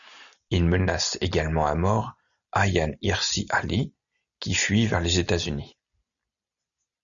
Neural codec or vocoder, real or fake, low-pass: none; real; 7.2 kHz